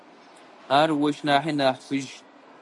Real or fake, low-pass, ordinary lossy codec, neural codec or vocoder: real; 10.8 kHz; MP3, 96 kbps; none